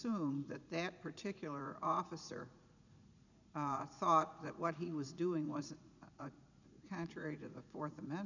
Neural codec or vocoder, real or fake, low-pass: vocoder, 44.1 kHz, 80 mel bands, Vocos; fake; 7.2 kHz